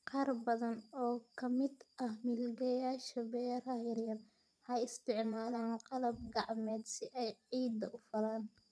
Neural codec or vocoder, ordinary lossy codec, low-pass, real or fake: vocoder, 22.05 kHz, 80 mel bands, Vocos; none; none; fake